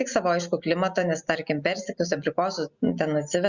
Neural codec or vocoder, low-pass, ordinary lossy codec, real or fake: none; 7.2 kHz; Opus, 64 kbps; real